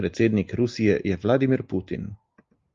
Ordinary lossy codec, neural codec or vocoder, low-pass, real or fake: Opus, 32 kbps; none; 7.2 kHz; real